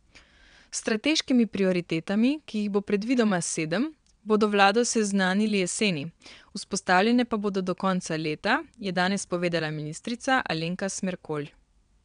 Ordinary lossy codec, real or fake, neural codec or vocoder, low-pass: MP3, 96 kbps; fake; vocoder, 22.05 kHz, 80 mel bands, WaveNeXt; 9.9 kHz